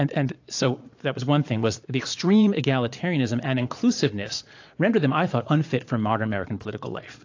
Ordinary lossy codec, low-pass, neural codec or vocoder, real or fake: AAC, 48 kbps; 7.2 kHz; vocoder, 22.05 kHz, 80 mel bands, Vocos; fake